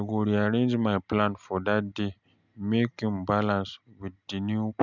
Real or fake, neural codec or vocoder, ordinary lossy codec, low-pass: real; none; none; 7.2 kHz